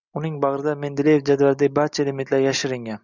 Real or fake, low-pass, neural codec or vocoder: real; 7.2 kHz; none